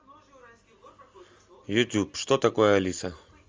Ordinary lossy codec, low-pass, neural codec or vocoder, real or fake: Opus, 32 kbps; 7.2 kHz; none; real